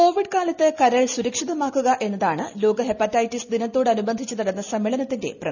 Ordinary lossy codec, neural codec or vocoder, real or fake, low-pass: none; none; real; 7.2 kHz